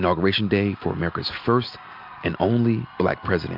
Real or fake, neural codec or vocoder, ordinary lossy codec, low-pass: real; none; MP3, 48 kbps; 5.4 kHz